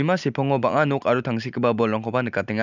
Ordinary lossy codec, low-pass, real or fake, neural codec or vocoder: none; 7.2 kHz; real; none